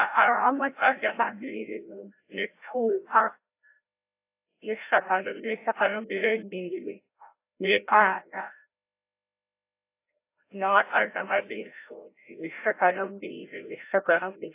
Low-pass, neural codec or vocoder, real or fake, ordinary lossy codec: 3.6 kHz; codec, 16 kHz, 0.5 kbps, FreqCodec, larger model; fake; AAC, 24 kbps